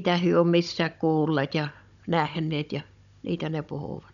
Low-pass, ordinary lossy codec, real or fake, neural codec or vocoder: 7.2 kHz; none; fake; codec, 16 kHz, 16 kbps, FunCodec, trained on Chinese and English, 50 frames a second